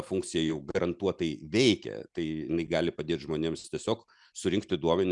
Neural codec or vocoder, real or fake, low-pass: none; real; 10.8 kHz